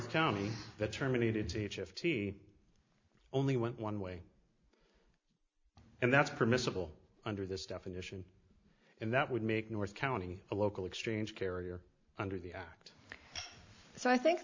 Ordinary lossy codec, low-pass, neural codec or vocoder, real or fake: MP3, 64 kbps; 7.2 kHz; none; real